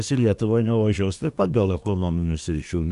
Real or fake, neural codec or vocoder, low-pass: fake; codec, 24 kHz, 1 kbps, SNAC; 10.8 kHz